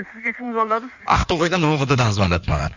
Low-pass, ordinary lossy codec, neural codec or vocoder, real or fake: 7.2 kHz; none; codec, 16 kHz in and 24 kHz out, 1.1 kbps, FireRedTTS-2 codec; fake